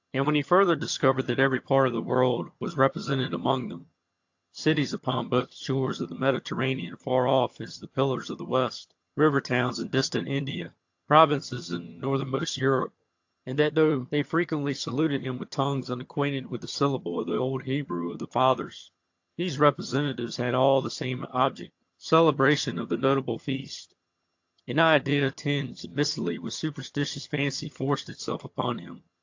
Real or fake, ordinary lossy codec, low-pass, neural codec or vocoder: fake; AAC, 48 kbps; 7.2 kHz; vocoder, 22.05 kHz, 80 mel bands, HiFi-GAN